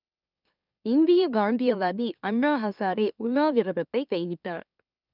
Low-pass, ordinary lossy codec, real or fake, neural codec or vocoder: 5.4 kHz; none; fake; autoencoder, 44.1 kHz, a latent of 192 numbers a frame, MeloTTS